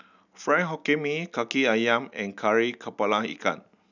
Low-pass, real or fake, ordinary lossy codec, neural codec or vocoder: 7.2 kHz; real; none; none